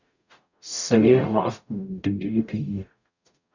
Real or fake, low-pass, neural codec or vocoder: fake; 7.2 kHz; codec, 44.1 kHz, 0.9 kbps, DAC